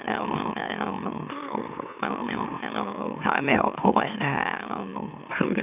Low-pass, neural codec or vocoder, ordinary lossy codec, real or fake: 3.6 kHz; autoencoder, 44.1 kHz, a latent of 192 numbers a frame, MeloTTS; none; fake